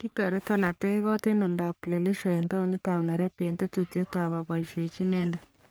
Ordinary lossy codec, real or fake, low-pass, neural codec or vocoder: none; fake; none; codec, 44.1 kHz, 3.4 kbps, Pupu-Codec